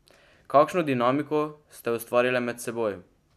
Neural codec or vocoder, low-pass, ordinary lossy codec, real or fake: none; 14.4 kHz; none; real